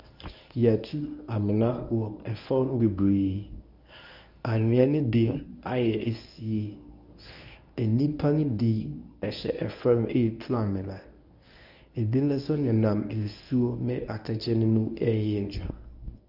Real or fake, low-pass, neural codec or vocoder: fake; 5.4 kHz; codec, 24 kHz, 0.9 kbps, WavTokenizer, medium speech release version 1